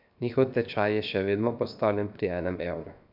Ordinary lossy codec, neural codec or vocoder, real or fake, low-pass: AAC, 48 kbps; codec, 16 kHz, 0.7 kbps, FocalCodec; fake; 5.4 kHz